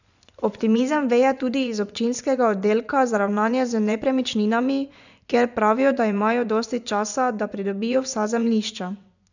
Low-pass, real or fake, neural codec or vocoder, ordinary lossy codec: 7.2 kHz; fake; vocoder, 24 kHz, 100 mel bands, Vocos; none